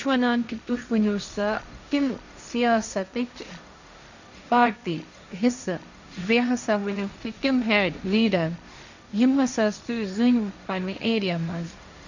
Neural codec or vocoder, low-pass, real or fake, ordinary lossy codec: codec, 16 kHz, 1.1 kbps, Voila-Tokenizer; 7.2 kHz; fake; none